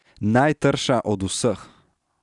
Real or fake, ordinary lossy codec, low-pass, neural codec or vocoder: real; none; 10.8 kHz; none